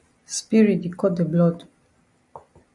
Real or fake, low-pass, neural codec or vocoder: real; 10.8 kHz; none